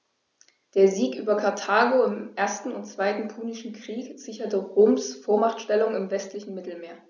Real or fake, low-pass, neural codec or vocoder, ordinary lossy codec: real; 7.2 kHz; none; none